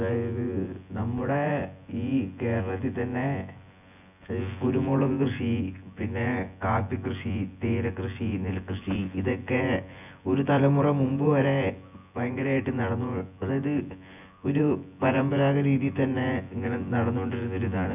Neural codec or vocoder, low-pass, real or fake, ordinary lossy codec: vocoder, 24 kHz, 100 mel bands, Vocos; 3.6 kHz; fake; none